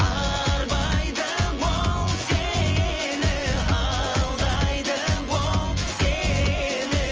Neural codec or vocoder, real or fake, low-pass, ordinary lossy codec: vocoder, 24 kHz, 100 mel bands, Vocos; fake; 7.2 kHz; Opus, 24 kbps